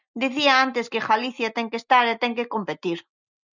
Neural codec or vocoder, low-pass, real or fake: none; 7.2 kHz; real